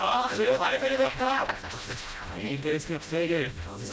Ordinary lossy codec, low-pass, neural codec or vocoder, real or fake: none; none; codec, 16 kHz, 0.5 kbps, FreqCodec, smaller model; fake